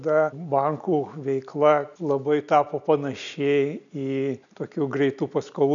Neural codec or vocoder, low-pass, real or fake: none; 7.2 kHz; real